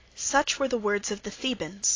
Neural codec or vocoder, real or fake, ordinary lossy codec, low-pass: none; real; AAC, 32 kbps; 7.2 kHz